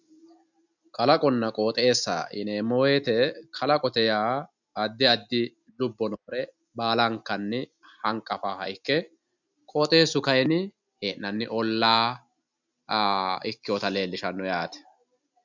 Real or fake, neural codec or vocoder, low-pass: real; none; 7.2 kHz